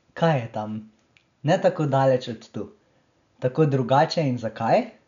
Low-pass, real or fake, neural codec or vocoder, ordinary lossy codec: 7.2 kHz; real; none; none